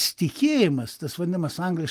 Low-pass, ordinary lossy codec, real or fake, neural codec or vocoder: 14.4 kHz; Opus, 32 kbps; real; none